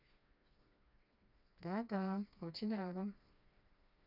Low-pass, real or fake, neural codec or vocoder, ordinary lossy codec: 5.4 kHz; fake; codec, 16 kHz, 2 kbps, FreqCodec, smaller model; none